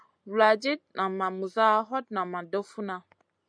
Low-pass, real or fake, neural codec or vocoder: 9.9 kHz; real; none